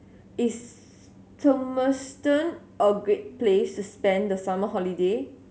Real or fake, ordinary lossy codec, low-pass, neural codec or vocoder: real; none; none; none